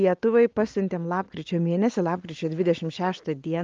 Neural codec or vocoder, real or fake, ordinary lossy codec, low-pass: none; real; Opus, 32 kbps; 7.2 kHz